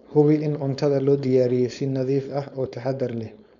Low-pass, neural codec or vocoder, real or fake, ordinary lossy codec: 7.2 kHz; codec, 16 kHz, 4.8 kbps, FACodec; fake; none